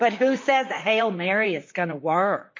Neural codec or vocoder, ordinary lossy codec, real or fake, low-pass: vocoder, 44.1 kHz, 128 mel bands, Pupu-Vocoder; MP3, 32 kbps; fake; 7.2 kHz